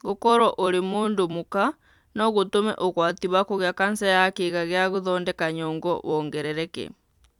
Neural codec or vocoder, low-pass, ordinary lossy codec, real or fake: vocoder, 44.1 kHz, 128 mel bands every 256 samples, BigVGAN v2; 19.8 kHz; none; fake